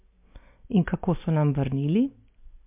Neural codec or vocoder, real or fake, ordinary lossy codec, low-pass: none; real; MP3, 32 kbps; 3.6 kHz